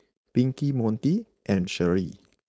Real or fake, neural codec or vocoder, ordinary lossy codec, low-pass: fake; codec, 16 kHz, 4.8 kbps, FACodec; none; none